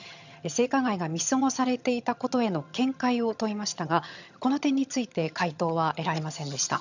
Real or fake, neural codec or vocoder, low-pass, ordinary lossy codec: fake; vocoder, 22.05 kHz, 80 mel bands, HiFi-GAN; 7.2 kHz; none